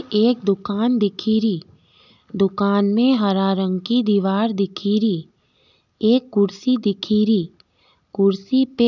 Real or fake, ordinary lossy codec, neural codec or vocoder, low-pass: real; none; none; 7.2 kHz